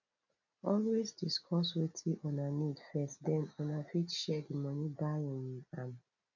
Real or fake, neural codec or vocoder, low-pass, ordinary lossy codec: real; none; 7.2 kHz; none